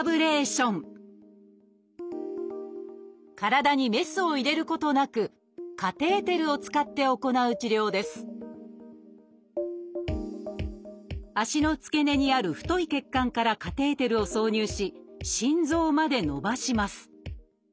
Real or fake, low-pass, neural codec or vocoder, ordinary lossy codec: real; none; none; none